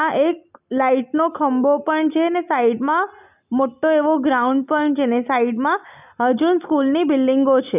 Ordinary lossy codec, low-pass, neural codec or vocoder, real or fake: none; 3.6 kHz; none; real